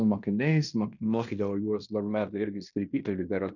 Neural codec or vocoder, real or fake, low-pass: codec, 16 kHz in and 24 kHz out, 0.9 kbps, LongCat-Audio-Codec, fine tuned four codebook decoder; fake; 7.2 kHz